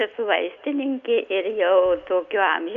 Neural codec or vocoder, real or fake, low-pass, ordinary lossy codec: none; real; 7.2 kHz; Opus, 64 kbps